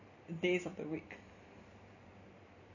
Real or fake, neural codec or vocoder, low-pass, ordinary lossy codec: real; none; 7.2 kHz; none